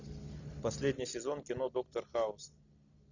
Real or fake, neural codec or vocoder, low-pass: real; none; 7.2 kHz